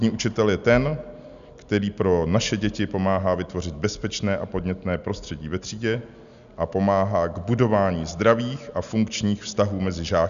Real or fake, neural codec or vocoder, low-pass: real; none; 7.2 kHz